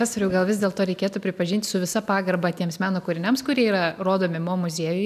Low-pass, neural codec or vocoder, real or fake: 14.4 kHz; none; real